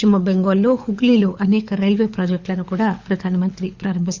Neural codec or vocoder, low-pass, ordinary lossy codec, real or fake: codec, 24 kHz, 6 kbps, HILCodec; 7.2 kHz; Opus, 64 kbps; fake